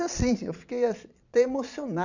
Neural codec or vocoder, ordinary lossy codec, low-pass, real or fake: vocoder, 22.05 kHz, 80 mel bands, Vocos; none; 7.2 kHz; fake